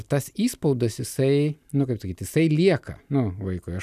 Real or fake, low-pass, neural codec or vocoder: real; 14.4 kHz; none